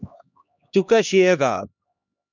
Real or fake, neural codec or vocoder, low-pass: fake; codec, 16 kHz, 1 kbps, X-Codec, HuBERT features, trained on LibriSpeech; 7.2 kHz